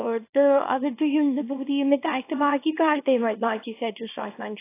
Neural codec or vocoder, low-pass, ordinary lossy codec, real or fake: codec, 24 kHz, 0.9 kbps, WavTokenizer, small release; 3.6 kHz; AAC, 24 kbps; fake